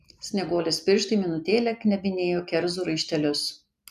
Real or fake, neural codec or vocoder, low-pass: real; none; 14.4 kHz